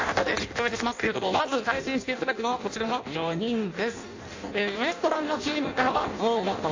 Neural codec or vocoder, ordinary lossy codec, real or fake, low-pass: codec, 16 kHz in and 24 kHz out, 0.6 kbps, FireRedTTS-2 codec; AAC, 48 kbps; fake; 7.2 kHz